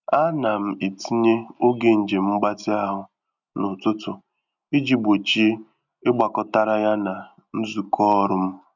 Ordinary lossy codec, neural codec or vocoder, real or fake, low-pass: none; none; real; 7.2 kHz